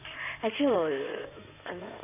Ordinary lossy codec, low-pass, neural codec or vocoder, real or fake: none; 3.6 kHz; codec, 16 kHz in and 24 kHz out, 2.2 kbps, FireRedTTS-2 codec; fake